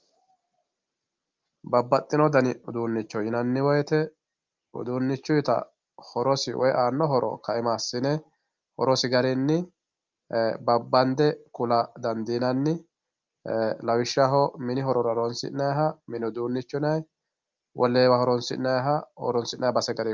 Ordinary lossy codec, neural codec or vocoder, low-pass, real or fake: Opus, 24 kbps; none; 7.2 kHz; real